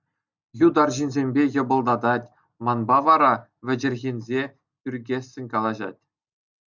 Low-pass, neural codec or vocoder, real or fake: 7.2 kHz; none; real